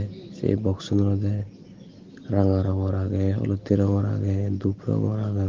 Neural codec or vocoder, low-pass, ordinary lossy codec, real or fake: none; 7.2 kHz; Opus, 16 kbps; real